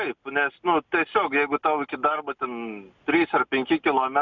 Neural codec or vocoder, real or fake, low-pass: none; real; 7.2 kHz